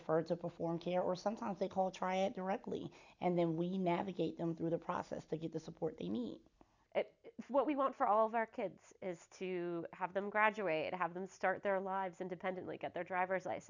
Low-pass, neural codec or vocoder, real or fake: 7.2 kHz; none; real